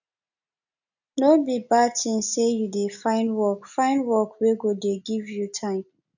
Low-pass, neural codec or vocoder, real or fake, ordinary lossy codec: 7.2 kHz; none; real; none